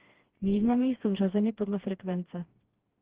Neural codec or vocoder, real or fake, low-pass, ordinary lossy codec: codec, 16 kHz, 2 kbps, FreqCodec, smaller model; fake; 3.6 kHz; Opus, 16 kbps